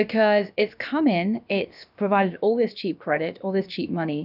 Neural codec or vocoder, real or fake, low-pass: codec, 16 kHz, about 1 kbps, DyCAST, with the encoder's durations; fake; 5.4 kHz